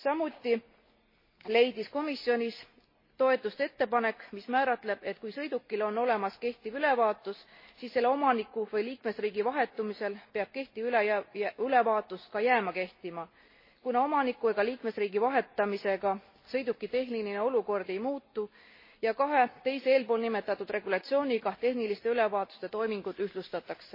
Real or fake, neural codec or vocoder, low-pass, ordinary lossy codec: real; none; 5.4 kHz; MP3, 24 kbps